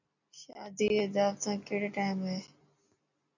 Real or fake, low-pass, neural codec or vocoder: real; 7.2 kHz; none